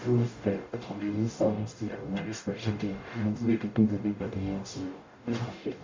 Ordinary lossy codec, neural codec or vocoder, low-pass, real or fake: MP3, 64 kbps; codec, 44.1 kHz, 0.9 kbps, DAC; 7.2 kHz; fake